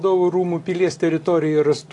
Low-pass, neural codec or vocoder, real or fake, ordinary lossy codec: 10.8 kHz; none; real; AAC, 48 kbps